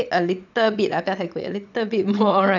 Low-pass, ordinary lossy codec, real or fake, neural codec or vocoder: 7.2 kHz; none; fake; vocoder, 22.05 kHz, 80 mel bands, Vocos